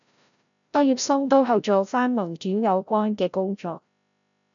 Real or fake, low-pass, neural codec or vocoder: fake; 7.2 kHz; codec, 16 kHz, 0.5 kbps, FreqCodec, larger model